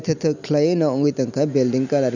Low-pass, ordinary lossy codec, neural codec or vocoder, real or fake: 7.2 kHz; none; none; real